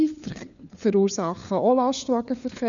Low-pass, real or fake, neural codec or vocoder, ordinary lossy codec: 7.2 kHz; fake; codec, 16 kHz, 4 kbps, FunCodec, trained on LibriTTS, 50 frames a second; none